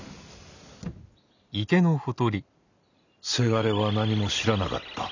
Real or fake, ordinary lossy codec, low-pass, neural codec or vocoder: real; none; 7.2 kHz; none